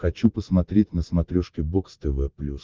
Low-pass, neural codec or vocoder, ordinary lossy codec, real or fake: 7.2 kHz; none; Opus, 32 kbps; real